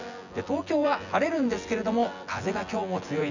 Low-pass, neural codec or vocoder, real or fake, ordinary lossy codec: 7.2 kHz; vocoder, 24 kHz, 100 mel bands, Vocos; fake; none